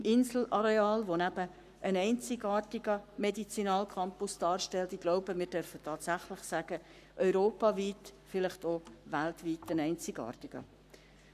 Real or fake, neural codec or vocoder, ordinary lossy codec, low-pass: fake; codec, 44.1 kHz, 7.8 kbps, Pupu-Codec; none; 14.4 kHz